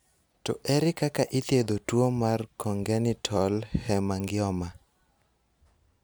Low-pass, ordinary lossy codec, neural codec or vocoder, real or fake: none; none; none; real